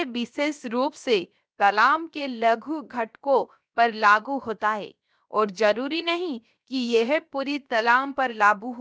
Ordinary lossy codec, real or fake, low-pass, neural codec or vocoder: none; fake; none; codec, 16 kHz, 0.7 kbps, FocalCodec